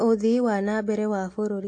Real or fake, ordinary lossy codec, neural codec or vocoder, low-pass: real; AAC, 48 kbps; none; 9.9 kHz